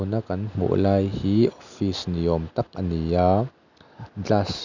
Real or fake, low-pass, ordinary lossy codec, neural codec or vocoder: real; 7.2 kHz; none; none